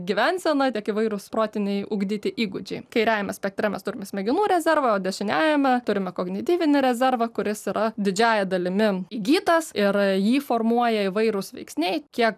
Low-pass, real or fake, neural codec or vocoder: 14.4 kHz; real; none